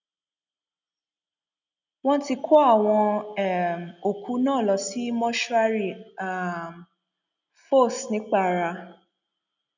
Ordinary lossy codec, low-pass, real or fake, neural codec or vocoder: none; 7.2 kHz; real; none